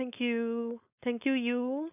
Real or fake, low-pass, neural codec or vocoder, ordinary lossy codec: fake; 3.6 kHz; codec, 16 kHz, 4.8 kbps, FACodec; none